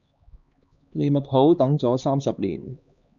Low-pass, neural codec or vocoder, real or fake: 7.2 kHz; codec, 16 kHz, 2 kbps, X-Codec, HuBERT features, trained on LibriSpeech; fake